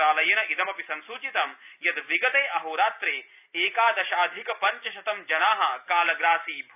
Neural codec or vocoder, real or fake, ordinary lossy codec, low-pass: none; real; none; 3.6 kHz